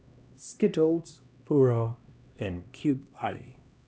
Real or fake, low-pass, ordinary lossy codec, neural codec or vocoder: fake; none; none; codec, 16 kHz, 1 kbps, X-Codec, HuBERT features, trained on LibriSpeech